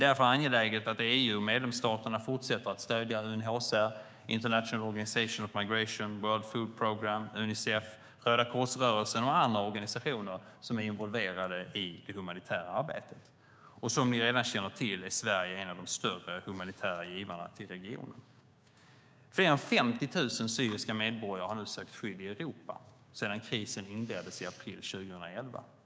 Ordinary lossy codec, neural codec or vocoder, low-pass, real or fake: none; codec, 16 kHz, 6 kbps, DAC; none; fake